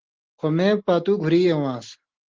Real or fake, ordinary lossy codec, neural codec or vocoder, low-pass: real; Opus, 16 kbps; none; 7.2 kHz